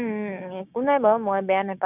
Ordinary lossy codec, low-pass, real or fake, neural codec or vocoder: none; 3.6 kHz; fake; codec, 16 kHz, 6 kbps, DAC